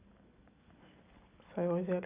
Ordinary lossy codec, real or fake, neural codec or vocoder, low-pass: Opus, 64 kbps; real; none; 3.6 kHz